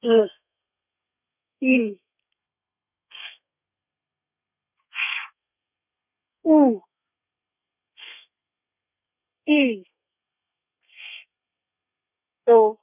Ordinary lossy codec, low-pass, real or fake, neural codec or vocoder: none; 3.6 kHz; fake; codec, 32 kHz, 1.9 kbps, SNAC